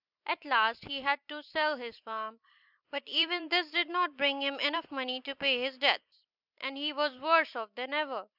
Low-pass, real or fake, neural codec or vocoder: 5.4 kHz; fake; vocoder, 44.1 kHz, 128 mel bands every 256 samples, BigVGAN v2